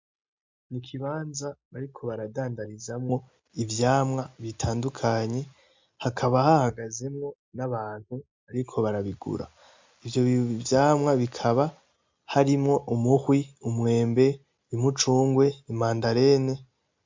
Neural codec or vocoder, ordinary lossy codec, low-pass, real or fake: none; MP3, 64 kbps; 7.2 kHz; real